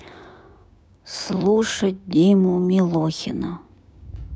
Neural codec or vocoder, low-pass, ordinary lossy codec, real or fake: codec, 16 kHz, 6 kbps, DAC; none; none; fake